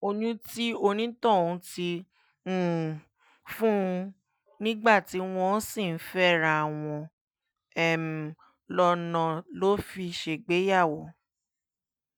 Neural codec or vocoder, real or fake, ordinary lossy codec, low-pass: none; real; none; none